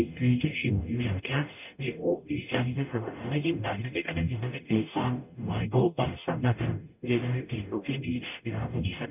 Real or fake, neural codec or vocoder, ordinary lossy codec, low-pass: fake; codec, 44.1 kHz, 0.9 kbps, DAC; none; 3.6 kHz